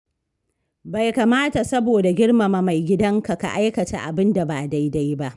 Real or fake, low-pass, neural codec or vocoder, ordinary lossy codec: real; 10.8 kHz; none; none